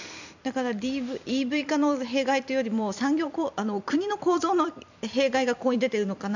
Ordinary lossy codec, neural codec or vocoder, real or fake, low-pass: none; vocoder, 44.1 kHz, 128 mel bands every 256 samples, BigVGAN v2; fake; 7.2 kHz